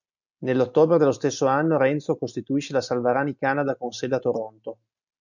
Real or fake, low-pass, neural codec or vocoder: real; 7.2 kHz; none